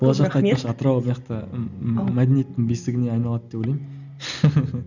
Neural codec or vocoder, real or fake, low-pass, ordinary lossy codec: none; real; 7.2 kHz; none